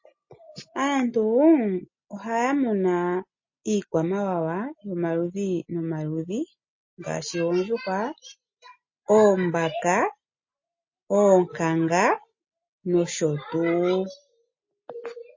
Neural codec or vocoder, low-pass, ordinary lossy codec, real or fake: none; 7.2 kHz; MP3, 32 kbps; real